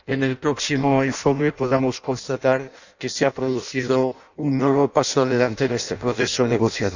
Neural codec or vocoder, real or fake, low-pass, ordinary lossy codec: codec, 16 kHz in and 24 kHz out, 0.6 kbps, FireRedTTS-2 codec; fake; 7.2 kHz; none